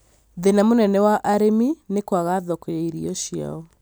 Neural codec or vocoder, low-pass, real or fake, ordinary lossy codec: none; none; real; none